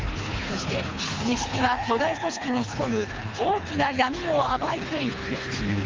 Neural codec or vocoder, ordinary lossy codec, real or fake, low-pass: codec, 24 kHz, 3 kbps, HILCodec; Opus, 32 kbps; fake; 7.2 kHz